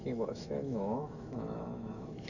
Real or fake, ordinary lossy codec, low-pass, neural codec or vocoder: fake; none; 7.2 kHz; autoencoder, 48 kHz, 128 numbers a frame, DAC-VAE, trained on Japanese speech